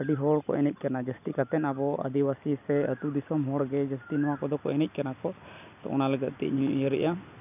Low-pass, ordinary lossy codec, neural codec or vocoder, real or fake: 3.6 kHz; none; none; real